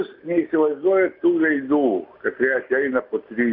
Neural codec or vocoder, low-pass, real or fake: none; 5.4 kHz; real